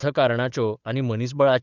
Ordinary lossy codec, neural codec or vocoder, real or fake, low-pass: Opus, 64 kbps; codec, 16 kHz, 8 kbps, FunCodec, trained on Chinese and English, 25 frames a second; fake; 7.2 kHz